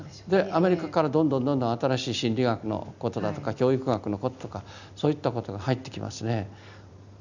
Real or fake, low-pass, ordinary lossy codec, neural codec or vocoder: real; 7.2 kHz; none; none